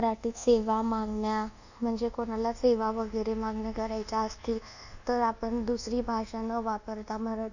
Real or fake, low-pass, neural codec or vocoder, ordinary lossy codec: fake; 7.2 kHz; codec, 24 kHz, 1.2 kbps, DualCodec; none